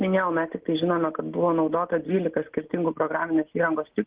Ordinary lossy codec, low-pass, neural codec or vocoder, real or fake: Opus, 16 kbps; 3.6 kHz; none; real